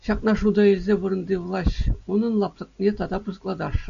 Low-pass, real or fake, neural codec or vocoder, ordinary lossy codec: 7.2 kHz; real; none; MP3, 96 kbps